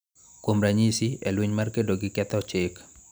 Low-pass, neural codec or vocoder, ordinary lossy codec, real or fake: none; none; none; real